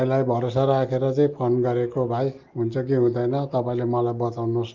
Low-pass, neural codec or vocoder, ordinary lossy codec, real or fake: 7.2 kHz; none; Opus, 32 kbps; real